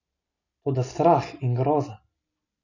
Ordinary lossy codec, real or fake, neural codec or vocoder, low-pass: none; real; none; 7.2 kHz